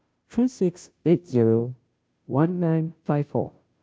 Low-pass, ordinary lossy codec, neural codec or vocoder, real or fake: none; none; codec, 16 kHz, 0.5 kbps, FunCodec, trained on Chinese and English, 25 frames a second; fake